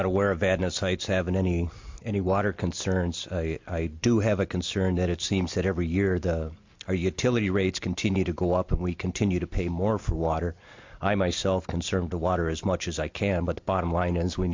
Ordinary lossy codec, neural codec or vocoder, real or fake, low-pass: MP3, 48 kbps; none; real; 7.2 kHz